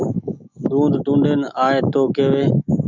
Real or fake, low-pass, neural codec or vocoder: fake; 7.2 kHz; autoencoder, 48 kHz, 128 numbers a frame, DAC-VAE, trained on Japanese speech